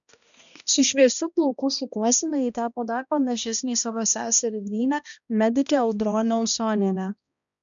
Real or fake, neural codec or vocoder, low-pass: fake; codec, 16 kHz, 1 kbps, X-Codec, HuBERT features, trained on balanced general audio; 7.2 kHz